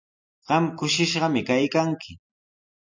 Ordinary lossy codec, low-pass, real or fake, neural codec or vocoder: MP3, 64 kbps; 7.2 kHz; real; none